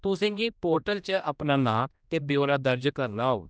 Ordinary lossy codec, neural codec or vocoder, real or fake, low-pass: none; codec, 16 kHz, 1 kbps, X-Codec, HuBERT features, trained on general audio; fake; none